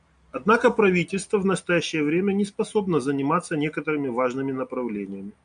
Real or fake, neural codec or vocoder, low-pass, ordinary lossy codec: real; none; 9.9 kHz; MP3, 96 kbps